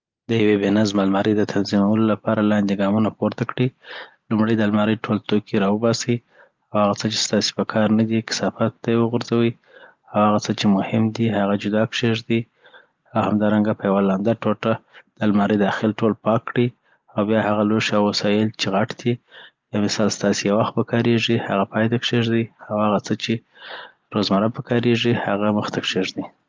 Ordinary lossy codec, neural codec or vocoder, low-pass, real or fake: Opus, 32 kbps; none; 7.2 kHz; real